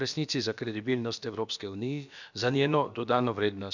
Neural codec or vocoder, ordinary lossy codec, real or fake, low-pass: codec, 16 kHz, about 1 kbps, DyCAST, with the encoder's durations; none; fake; 7.2 kHz